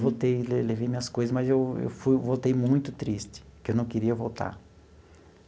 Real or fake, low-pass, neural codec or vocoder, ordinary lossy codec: real; none; none; none